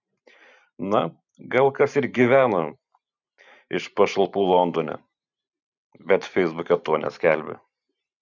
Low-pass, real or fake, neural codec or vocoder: 7.2 kHz; fake; vocoder, 44.1 kHz, 128 mel bands every 512 samples, BigVGAN v2